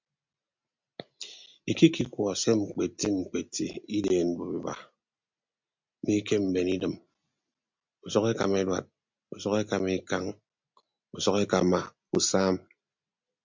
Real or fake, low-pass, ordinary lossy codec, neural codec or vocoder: real; 7.2 kHz; MP3, 64 kbps; none